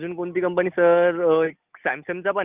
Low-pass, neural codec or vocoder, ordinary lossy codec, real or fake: 3.6 kHz; none; Opus, 32 kbps; real